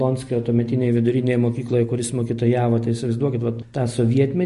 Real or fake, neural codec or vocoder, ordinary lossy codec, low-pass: real; none; MP3, 48 kbps; 14.4 kHz